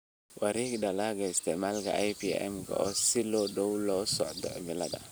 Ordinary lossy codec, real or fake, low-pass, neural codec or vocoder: none; real; none; none